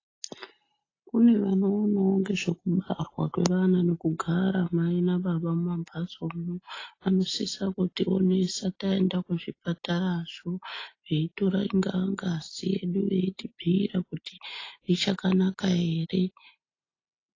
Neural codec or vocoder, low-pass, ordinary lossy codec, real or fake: none; 7.2 kHz; AAC, 32 kbps; real